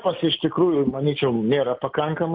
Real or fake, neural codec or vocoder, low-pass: real; none; 5.4 kHz